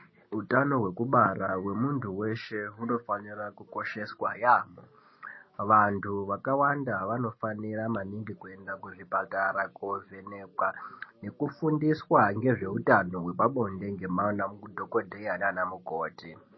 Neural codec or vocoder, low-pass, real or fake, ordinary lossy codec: none; 7.2 kHz; real; MP3, 24 kbps